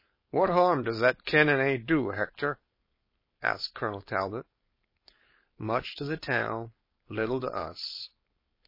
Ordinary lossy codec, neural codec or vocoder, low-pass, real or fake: MP3, 24 kbps; codec, 16 kHz, 4.8 kbps, FACodec; 5.4 kHz; fake